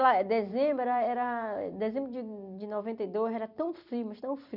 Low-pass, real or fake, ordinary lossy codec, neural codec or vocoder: 5.4 kHz; fake; none; vocoder, 44.1 kHz, 128 mel bands every 256 samples, BigVGAN v2